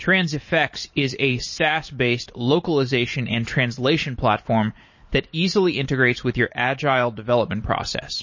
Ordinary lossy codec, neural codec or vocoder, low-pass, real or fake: MP3, 32 kbps; vocoder, 44.1 kHz, 128 mel bands every 512 samples, BigVGAN v2; 7.2 kHz; fake